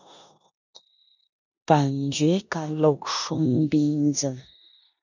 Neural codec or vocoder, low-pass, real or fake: codec, 16 kHz in and 24 kHz out, 0.9 kbps, LongCat-Audio-Codec, four codebook decoder; 7.2 kHz; fake